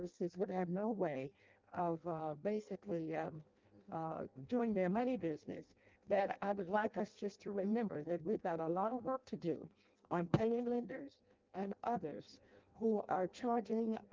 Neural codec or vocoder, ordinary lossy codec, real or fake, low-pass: codec, 16 kHz in and 24 kHz out, 0.6 kbps, FireRedTTS-2 codec; Opus, 32 kbps; fake; 7.2 kHz